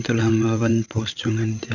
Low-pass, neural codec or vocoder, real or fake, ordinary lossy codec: 7.2 kHz; codec, 16 kHz, 8 kbps, FreqCodec, larger model; fake; Opus, 64 kbps